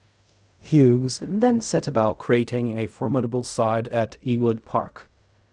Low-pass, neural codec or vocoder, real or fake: 10.8 kHz; codec, 16 kHz in and 24 kHz out, 0.4 kbps, LongCat-Audio-Codec, fine tuned four codebook decoder; fake